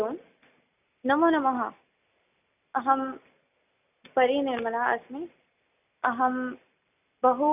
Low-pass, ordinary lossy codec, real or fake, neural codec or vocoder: 3.6 kHz; none; real; none